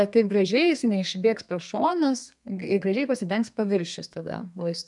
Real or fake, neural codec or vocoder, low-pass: fake; codec, 32 kHz, 1.9 kbps, SNAC; 10.8 kHz